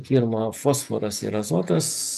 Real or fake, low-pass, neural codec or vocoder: fake; 14.4 kHz; vocoder, 48 kHz, 128 mel bands, Vocos